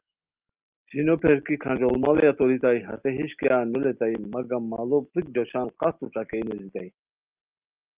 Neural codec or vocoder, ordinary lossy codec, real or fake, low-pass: none; Opus, 32 kbps; real; 3.6 kHz